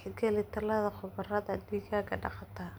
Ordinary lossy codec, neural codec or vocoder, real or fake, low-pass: none; none; real; none